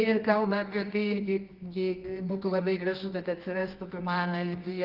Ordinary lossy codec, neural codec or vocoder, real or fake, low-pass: Opus, 32 kbps; codec, 24 kHz, 0.9 kbps, WavTokenizer, medium music audio release; fake; 5.4 kHz